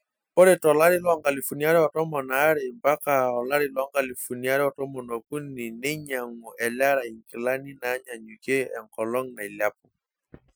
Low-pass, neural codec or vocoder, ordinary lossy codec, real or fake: none; none; none; real